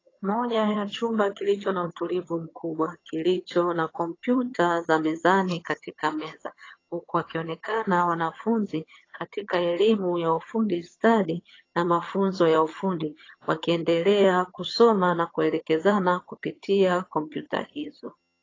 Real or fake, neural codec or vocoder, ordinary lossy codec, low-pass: fake; vocoder, 22.05 kHz, 80 mel bands, HiFi-GAN; AAC, 32 kbps; 7.2 kHz